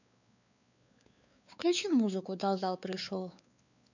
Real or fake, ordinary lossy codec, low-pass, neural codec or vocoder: fake; none; 7.2 kHz; codec, 16 kHz, 4 kbps, X-Codec, WavLM features, trained on Multilingual LibriSpeech